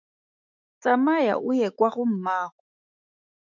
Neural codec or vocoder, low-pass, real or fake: autoencoder, 48 kHz, 128 numbers a frame, DAC-VAE, trained on Japanese speech; 7.2 kHz; fake